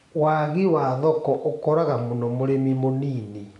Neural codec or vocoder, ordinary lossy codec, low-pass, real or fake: none; none; 10.8 kHz; real